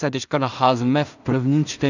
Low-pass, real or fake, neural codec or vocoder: 7.2 kHz; fake; codec, 16 kHz in and 24 kHz out, 0.4 kbps, LongCat-Audio-Codec, two codebook decoder